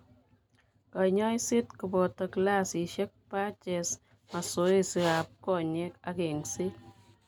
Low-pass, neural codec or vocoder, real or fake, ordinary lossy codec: none; none; real; none